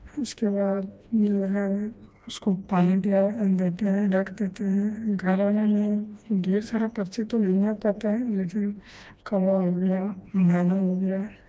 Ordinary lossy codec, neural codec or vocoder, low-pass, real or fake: none; codec, 16 kHz, 1 kbps, FreqCodec, smaller model; none; fake